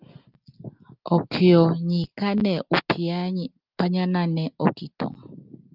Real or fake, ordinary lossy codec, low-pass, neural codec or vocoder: real; Opus, 32 kbps; 5.4 kHz; none